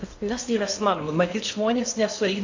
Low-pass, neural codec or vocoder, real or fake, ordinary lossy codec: 7.2 kHz; codec, 16 kHz in and 24 kHz out, 0.8 kbps, FocalCodec, streaming, 65536 codes; fake; AAC, 48 kbps